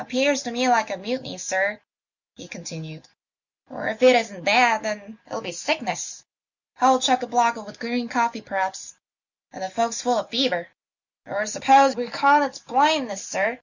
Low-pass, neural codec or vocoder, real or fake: 7.2 kHz; none; real